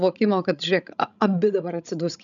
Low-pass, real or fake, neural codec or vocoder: 7.2 kHz; fake; codec, 16 kHz, 16 kbps, FreqCodec, larger model